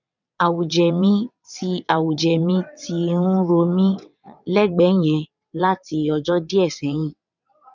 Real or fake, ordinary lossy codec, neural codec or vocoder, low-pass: fake; none; vocoder, 22.05 kHz, 80 mel bands, WaveNeXt; 7.2 kHz